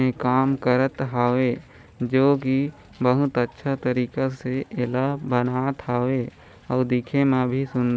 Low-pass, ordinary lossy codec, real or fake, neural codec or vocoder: none; none; real; none